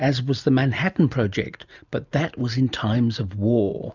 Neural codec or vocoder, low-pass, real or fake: none; 7.2 kHz; real